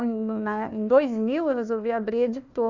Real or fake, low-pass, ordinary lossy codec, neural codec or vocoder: fake; 7.2 kHz; none; codec, 16 kHz, 1 kbps, FunCodec, trained on Chinese and English, 50 frames a second